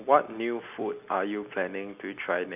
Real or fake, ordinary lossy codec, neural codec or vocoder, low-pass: real; none; none; 3.6 kHz